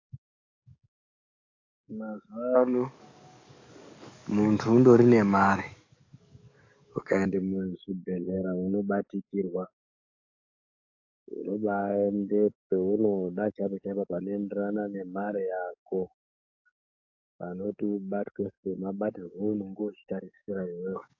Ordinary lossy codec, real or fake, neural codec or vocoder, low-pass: AAC, 48 kbps; fake; codec, 44.1 kHz, 7.8 kbps, DAC; 7.2 kHz